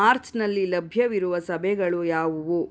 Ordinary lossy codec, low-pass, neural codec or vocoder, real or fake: none; none; none; real